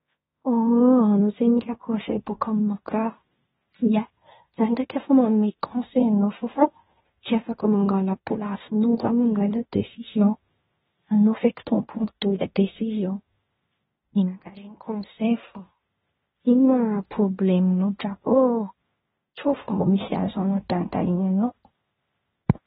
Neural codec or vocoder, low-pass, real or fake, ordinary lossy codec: codec, 16 kHz in and 24 kHz out, 0.9 kbps, LongCat-Audio-Codec, fine tuned four codebook decoder; 10.8 kHz; fake; AAC, 16 kbps